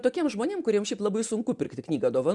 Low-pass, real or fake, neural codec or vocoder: 10.8 kHz; real; none